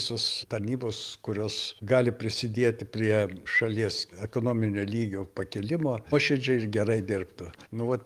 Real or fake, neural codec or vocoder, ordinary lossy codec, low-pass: fake; codec, 44.1 kHz, 7.8 kbps, DAC; Opus, 32 kbps; 14.4 kHz